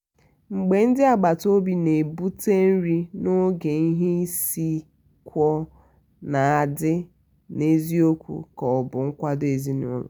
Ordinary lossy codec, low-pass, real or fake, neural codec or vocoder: none; none; real; none